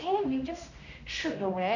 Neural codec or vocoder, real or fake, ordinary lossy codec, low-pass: codec, 16 kHz, 1 kbps, X-Codec, HuBERT features, trained on general audio; fake; none; 7.2 kHz